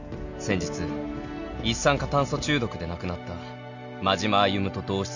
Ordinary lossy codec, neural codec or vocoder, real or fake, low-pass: none; none; real; 7.2 kHz